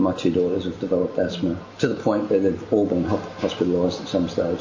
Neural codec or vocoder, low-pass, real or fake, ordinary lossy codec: none; 7.2 kHz; real; MP3, 32 kbps